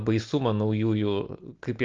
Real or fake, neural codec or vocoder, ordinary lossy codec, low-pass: real; none; Opus, 24 kbps; 7.2 kHz